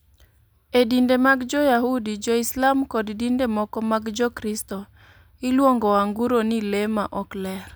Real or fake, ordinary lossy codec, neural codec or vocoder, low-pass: real; none; none; none